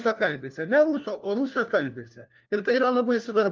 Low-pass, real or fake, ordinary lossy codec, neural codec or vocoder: 7.2 kHz; fake; Opus, 24 kbps; codec, 16 kHz, 1 kbps, FunCodec, trained on LibriTTS, 50 frames a second